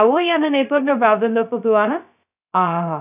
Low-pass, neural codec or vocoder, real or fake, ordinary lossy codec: 3.6 kHz; codec, 16 kHz, 0.2 kbps, FocalCodec; fake; none